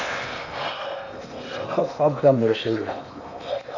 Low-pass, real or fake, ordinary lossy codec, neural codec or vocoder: 7.2 kHz; fake; AAC, 48 kbps; codec, 16 kHz in and 24 kHz out, 0.8 kbps, FocalCodec, streaming, 65536 codes